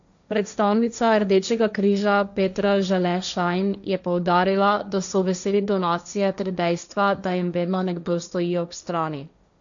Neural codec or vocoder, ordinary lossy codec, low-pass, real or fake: codec, 16 kHz, 1.1 kbps, Voila-Tokenizer; none; 7.2 kHz; fake